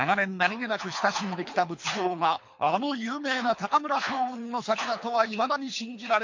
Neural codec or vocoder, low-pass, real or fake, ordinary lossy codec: codec, 24 kHz, 3 kbps, HILCodec; 7.2 kHz; fake; MP3, 48 kbps